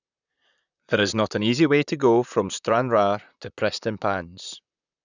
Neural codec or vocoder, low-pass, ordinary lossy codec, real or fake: vocoder, 44.1 kHz, 128 mel bands, Pupu-Vocoder; 7.2 kHz; none; fake